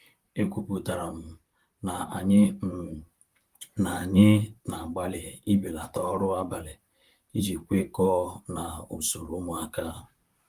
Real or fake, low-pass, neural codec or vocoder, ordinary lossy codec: fake; 14.4 kHz; vocoder, 44.1 kHz, 128 mel bands, Pupu-Vocoder; Opus, 32 kbps